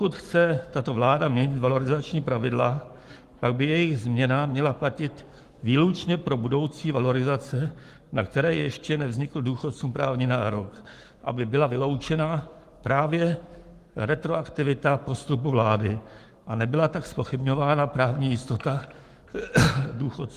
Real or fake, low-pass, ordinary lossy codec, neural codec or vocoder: fake; 14.4 kHz; Opus, 24 kbps; codec, 44.1 kHz, 7.8 kbps, Pupu-Codec